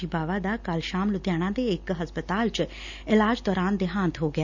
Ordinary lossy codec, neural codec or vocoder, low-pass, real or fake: none; none; 7.2 kHz; real